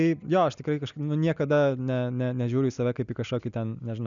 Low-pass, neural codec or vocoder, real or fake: 7.2 kHz; none; real